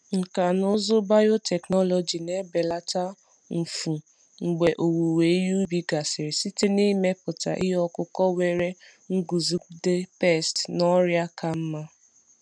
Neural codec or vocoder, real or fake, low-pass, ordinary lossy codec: none; real; 9.9 kHz; none